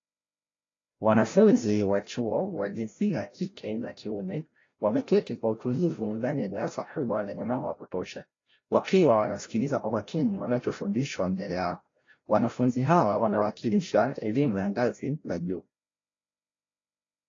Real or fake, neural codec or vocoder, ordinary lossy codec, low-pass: fake; codec, 16 kHz, 0.5 kbps, FreqCodec, larger model; AAC, 32 kbps; 7.2 kHz